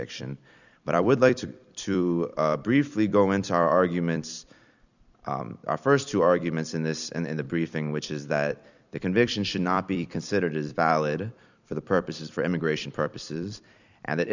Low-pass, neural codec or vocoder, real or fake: 7.2 kHz; vocoder, 44.1 kHz, 128 mel bands every 256 samples, BigVGAN v2; fake